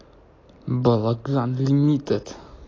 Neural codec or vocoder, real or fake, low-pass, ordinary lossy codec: none; real; 7.2 kHz; AAC, 32 kbps